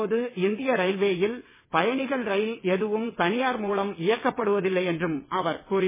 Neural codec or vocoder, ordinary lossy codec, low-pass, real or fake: vocoder, 22.05 kHz, 80 mel bands, WaveNeXt; MP3, 16 kbps; 3.6 kHz; fake